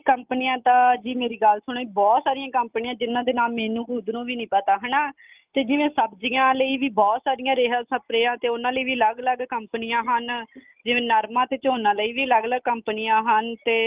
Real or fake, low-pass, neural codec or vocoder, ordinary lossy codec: real; 3.6 kHz; none; Opus, 32 kbps